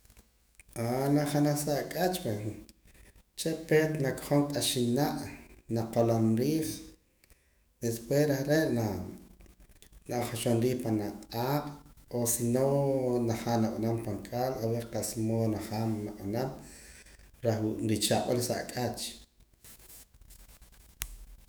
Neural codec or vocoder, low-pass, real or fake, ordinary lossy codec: autoencoder, 48 kHz, 128 numbers a frame, DAC-VAE, trained on Japanese speech; none; fake; none